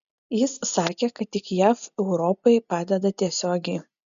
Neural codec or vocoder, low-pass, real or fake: none; 7.2 kHz; real